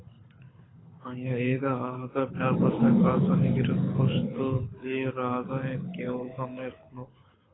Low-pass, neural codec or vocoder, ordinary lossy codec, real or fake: 7.2 kHz; codec, 24 kHz, 6 kbps, HILCodec; AAC, 16 kbps; fake